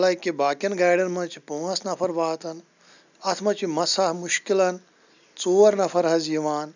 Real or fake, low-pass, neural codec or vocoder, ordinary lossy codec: real; 7.2 kHz; none; none